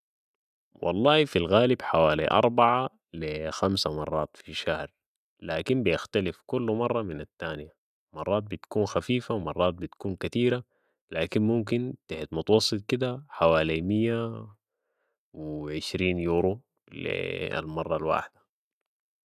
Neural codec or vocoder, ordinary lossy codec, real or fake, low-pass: none; none; real; 14.4 kHz